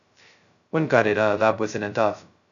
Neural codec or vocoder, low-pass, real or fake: codec, 16 kHz, 0.2 kbps, FocalCodec; 7.2 kHz; fake